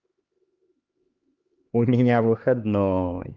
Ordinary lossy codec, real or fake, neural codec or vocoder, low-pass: Opus, 24 kbps; fake; codec, 16 kHz, 2 kbps, X-Codec, HuBERT features, trained on LibriSpeech; 7.2 kHz